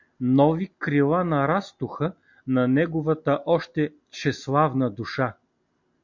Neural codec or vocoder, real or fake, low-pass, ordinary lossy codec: none; real; 7.2 kHz; MP3, 48 kbps